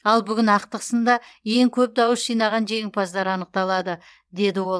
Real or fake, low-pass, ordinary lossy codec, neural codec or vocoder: fake; none; none; vocoder, 22.05 kHz, 80 mel bands, WaveNeXt